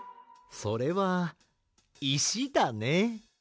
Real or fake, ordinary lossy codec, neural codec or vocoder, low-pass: real; none; none; none